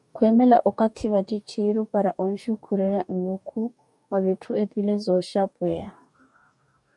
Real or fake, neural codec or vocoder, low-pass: fake; codec, 44.1 kHz, 2.6 kbps, DAC; 10.8 kHz